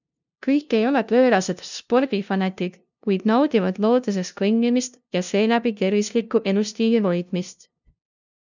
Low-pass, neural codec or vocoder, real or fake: 7.2 kHz; codec, 16 kHz, 0.5 kbps, FunCodec, trained on LibriTTS, 25 frames a second; fake